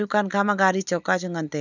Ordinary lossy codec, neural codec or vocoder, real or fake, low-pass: none; none; real; 7.2 kHz